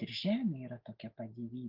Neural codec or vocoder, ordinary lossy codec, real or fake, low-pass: none; Opus, 32 kbps; real; 5.4 kHz